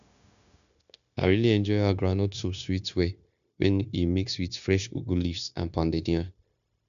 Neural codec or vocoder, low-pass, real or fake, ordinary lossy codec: codec, 16 kHz, 0.9 kbps, LongCat-Audio-Codec; 7.2 kHz; fake; none